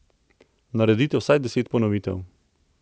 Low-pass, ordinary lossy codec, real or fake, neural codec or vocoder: none; none; real; none